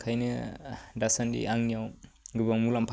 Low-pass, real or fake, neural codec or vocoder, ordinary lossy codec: none; real; none; none